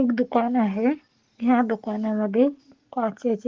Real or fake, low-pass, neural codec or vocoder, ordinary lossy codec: real; 7.2 kHz; none; Opus, 16 kbps